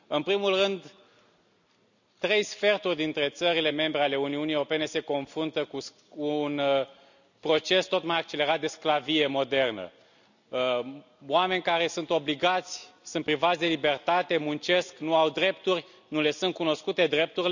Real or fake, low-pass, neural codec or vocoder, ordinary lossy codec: real; 7.2 kHz; none; none